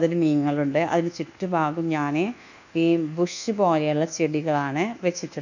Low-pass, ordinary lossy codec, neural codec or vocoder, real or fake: 7.2 kHz; none; codec, 24 kHz, 1.2 kbps, DualCodec; fake